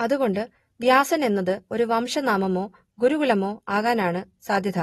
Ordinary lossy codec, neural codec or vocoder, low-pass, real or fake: AAC, 32 kbps; none; 19.8 kHz; real